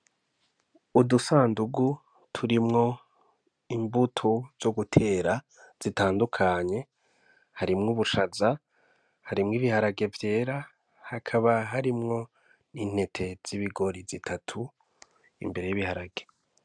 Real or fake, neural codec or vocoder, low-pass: real; none; 9.9 kHz